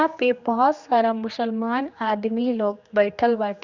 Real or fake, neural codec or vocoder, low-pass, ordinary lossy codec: fake; codec, 16 kHz, 2 kbps, X-Codec, HuBERT features, trained on general audio; 7.2 kHz; Opus, 64 kbps